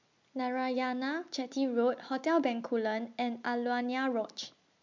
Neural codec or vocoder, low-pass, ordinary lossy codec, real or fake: none; 7.2 kHz; MP3, 64 kbps; real